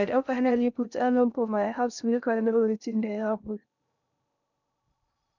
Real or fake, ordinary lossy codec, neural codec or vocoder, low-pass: fake; none; codec, 16 kHz in and 24 kHz out, 0.6 kbps, FocalCodec, streaming, 2048 codes; 7.2 kHz